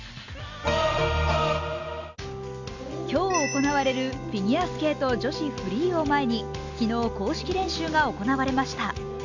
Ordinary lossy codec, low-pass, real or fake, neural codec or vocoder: none; 7.2 kHz; real; none